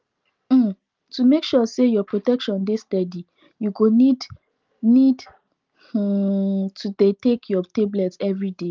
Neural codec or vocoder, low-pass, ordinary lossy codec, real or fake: none; 7.2 kHz; Opus, 24 kbps; real